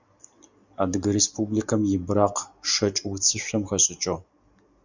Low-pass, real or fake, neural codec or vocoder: 7.2 kHz; real; none